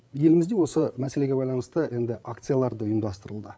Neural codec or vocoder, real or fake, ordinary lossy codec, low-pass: codec, 16 kHz, 8 kbps, FreqCodec, larger model; fake; none; none